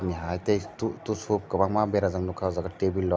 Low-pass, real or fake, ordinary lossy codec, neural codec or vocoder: none; real; none; none